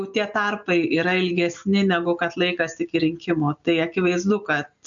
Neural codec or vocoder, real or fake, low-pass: none; real; 7.2 kHz